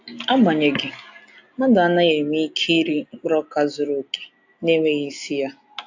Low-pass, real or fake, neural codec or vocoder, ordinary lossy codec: 7.2 kHz; real; none; AAC, 48 kbps